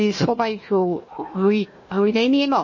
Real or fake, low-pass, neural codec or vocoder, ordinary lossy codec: fake; 7.2 kHz; codec, 16 kHz, 1 kbps, FunCodec, trained on Chinese and English, 50 frames a second; MP3, 32 kbps